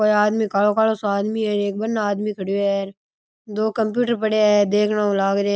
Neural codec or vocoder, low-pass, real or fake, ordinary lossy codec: none; none; real; none